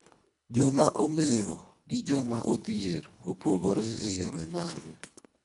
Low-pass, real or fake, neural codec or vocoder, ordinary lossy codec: 10.8 kHz; fake; codec, 24 kHz, 1.5 kbps, HILCodec; none